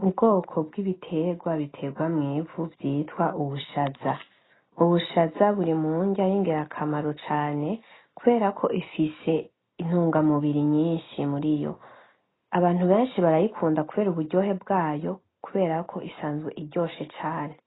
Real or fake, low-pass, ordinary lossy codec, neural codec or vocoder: real; 7.2 kHz; AAC, 16 kbps; none